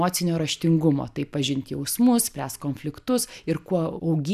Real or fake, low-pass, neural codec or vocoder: real; 14.4 kHz; none